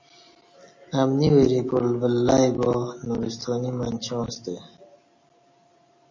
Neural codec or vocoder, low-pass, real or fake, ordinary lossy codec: none; 7.2 kHz; real; MP3, 32 kbps